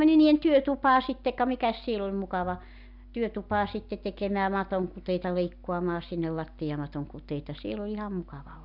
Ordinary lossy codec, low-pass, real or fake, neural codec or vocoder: none; 5.4 kHz; real; none